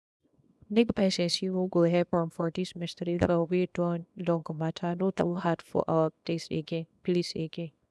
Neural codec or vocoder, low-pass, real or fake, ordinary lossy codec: codec, 24 kHz, 0.9 kbps, WavTokenizer, small release; none; fake; none